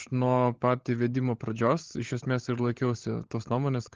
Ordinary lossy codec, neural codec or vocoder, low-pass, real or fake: Opus, 16 kbps; codec, 16 kHz, 16 kbps, FunCodec, trained on LibriTTS, 50 frames a second; 7.2 kHz; fake